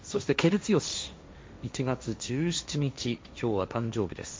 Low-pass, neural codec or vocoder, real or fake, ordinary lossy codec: none; codec, 16 kHz, 1.1 kbps, Voila-Tokenizer; fake; none